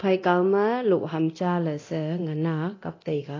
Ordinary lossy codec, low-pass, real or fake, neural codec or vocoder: AAC, 32 kbps; 7.2 kHz; fake; codec, 24 kHz, 0.9 kbps, DualCodec